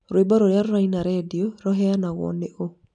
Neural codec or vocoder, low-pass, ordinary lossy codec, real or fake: none; 9.9 kHz; none; real